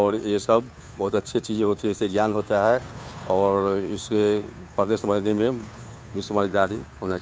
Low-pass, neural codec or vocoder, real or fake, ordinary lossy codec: none; codec, 16 kHz, 2 kbps, FunCodec, trained on Chinese and English, 25 frames a second; fake; none